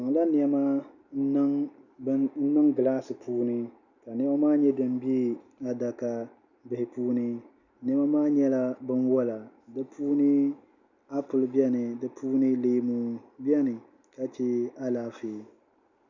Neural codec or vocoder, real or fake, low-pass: none; real; 7.2 kHz